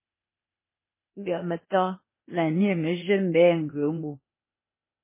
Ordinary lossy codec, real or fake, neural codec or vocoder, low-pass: MP3, 16 kbps; fake; codec, 16 kHz, 0.8 kbps, ZipCodec; 3.6 kHz